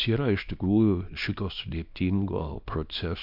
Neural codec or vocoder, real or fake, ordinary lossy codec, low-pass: codec, 24 kHz, 0.9 kbps, WavTokenizer, small release; fake; MP3, 48 kbps; 5.4 kHz